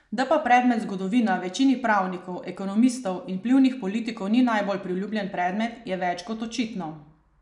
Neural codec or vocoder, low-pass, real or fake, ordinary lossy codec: none; 10.8 kHz; real; none